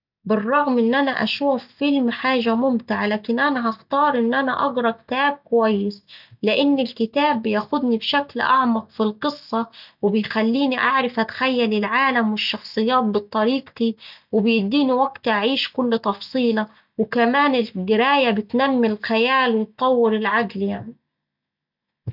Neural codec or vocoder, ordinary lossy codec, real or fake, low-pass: none; none; real; 5.4 kHz